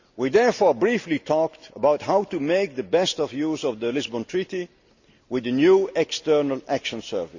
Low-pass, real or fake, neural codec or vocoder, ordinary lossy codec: 7.2 kHz; real; none; Opus, 64 kbps